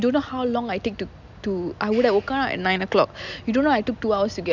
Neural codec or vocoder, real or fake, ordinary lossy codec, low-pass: none; real; none; 7.2 kHz